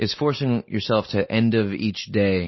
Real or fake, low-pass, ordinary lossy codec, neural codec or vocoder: real; 7.2 kHz; MP3, 24 kbps; none